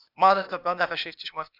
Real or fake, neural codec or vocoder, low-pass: fake; codec, 16 kHz, 0.8 kbps, ZipCodec; 5.4 kHz